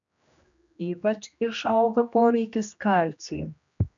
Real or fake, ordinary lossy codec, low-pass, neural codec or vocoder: fake; MP3, 64 kbps; 7.2 kHz; codec, 16 kHz, 1 kbps, X-Codec, HuBERT features, trained on general audio